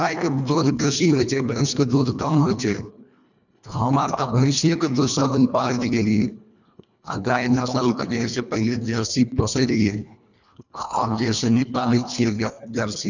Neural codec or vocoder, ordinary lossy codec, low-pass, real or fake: codec, 24 kHz, 1.5 kbps, HILCodec; none; 7.2 kHz; fake